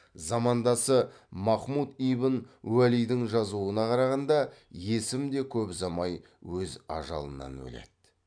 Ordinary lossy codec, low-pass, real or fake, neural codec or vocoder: MP3, 96 kbps; 9.9 kHz; real; none